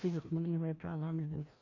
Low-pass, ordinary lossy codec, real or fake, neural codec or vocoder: 7.2 kHz; AAC, 48 kbps; fake; codec, 16 kHz, 1 kbps, FreqCodec, larger model